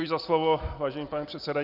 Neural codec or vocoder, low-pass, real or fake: none; 5.4 kHz; real